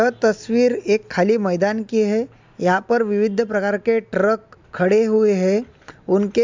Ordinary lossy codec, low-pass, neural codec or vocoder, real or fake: MP3, 64 kbps; 7.2 kHz; none; real